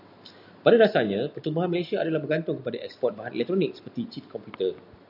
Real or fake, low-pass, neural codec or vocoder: real; 5.4 kHz; none